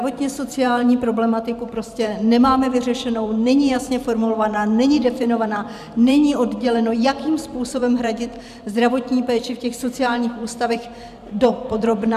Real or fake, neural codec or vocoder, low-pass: fake; vocoder, 44.1 kHz, 128 mel bands every 512 samples, BigVGAN v2; 14.4 kHz